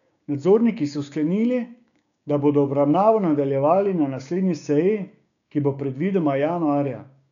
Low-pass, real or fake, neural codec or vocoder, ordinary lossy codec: 7.2 kHz; fake; codec, 16 kHz, 6 kbps, DAC; none